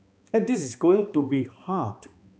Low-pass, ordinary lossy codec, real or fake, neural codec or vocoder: none; none; fake; codec, 16 kHz, 2 kbps, X-Codec, HuBERT features, trained on balanced general audio